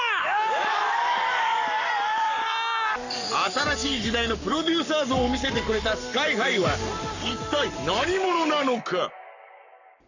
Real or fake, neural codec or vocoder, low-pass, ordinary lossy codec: fake; codec, 44.1 kHz, 7.8 kbps, Pupu-Codec; 7.2 kHz; none